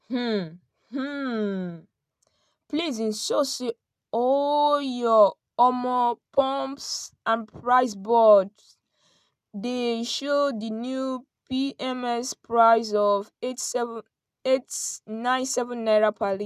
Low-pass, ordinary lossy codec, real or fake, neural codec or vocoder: 14.4 kHz; none; real; none